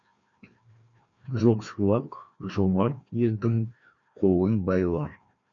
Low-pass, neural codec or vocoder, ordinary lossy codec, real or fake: 7.2 kHz; codec, 16 kHz, 1 kbps, FreqCodec, larger model; MP3, 48 kbps; fake